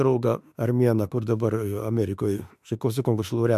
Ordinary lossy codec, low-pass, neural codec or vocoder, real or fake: MP3, 96 kbps; 14.4 kHz; autoencoder, 48 kHz, 32 numbers a frame, DAC-VAE, trained on Japanese speech; fake